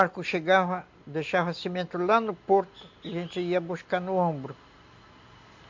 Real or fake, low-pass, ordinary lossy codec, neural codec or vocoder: real; 7.2 kHz; MP3, 48 kbps; none